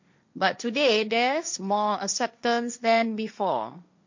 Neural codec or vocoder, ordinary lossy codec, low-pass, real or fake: codec, 16 kHz, 1.1 kbps, Voila-Tokenizer; MP3, 48 kbps; 7.2 kHz; fake